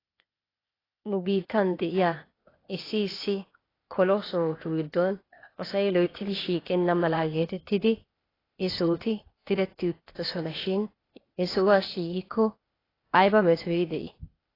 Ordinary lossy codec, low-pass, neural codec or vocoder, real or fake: AAC, 24 kbps; 5.4 kHz; codec, 16 kHz, 0.8 kbps, ZipCodec; fake